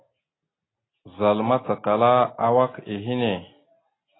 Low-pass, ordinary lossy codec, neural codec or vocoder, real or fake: 7.2 kHz; AAC, 16 kbps; none; real